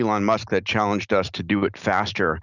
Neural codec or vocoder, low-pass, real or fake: none; 7.2 kHz; real